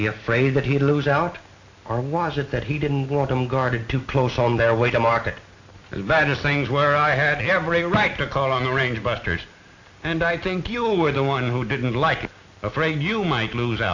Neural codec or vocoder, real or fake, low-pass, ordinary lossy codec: none; real; 7.2 kHz; AAC, 48 kbps